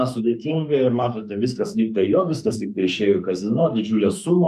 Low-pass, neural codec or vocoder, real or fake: 14.4 kHz; autoencoder, 48 kHz, 32 numbers a frame, DAC-VAE, trained on Japanese speech; fake